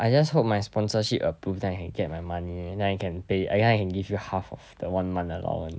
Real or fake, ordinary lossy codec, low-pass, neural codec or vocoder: real; none; none; none